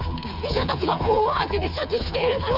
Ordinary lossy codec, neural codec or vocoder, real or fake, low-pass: none; codec, 16 kHz, 4 kbps, FreqCodec, smaller model; fake; 5.4 kHz